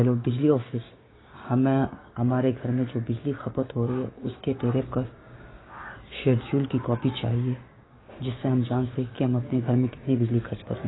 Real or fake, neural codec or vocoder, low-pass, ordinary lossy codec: fake; codec, 16 kHz, 6 kbps, DAC; 7.2 kHz; AAC, 16 kbps